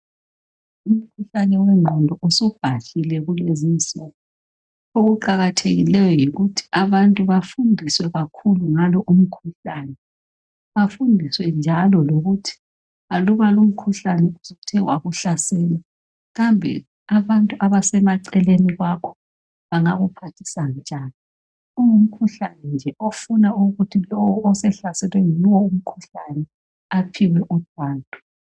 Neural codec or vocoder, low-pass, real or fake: none; 9.9 kHz; real